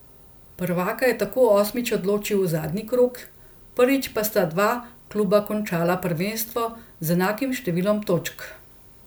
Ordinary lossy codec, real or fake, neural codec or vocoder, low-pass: none; real; none; none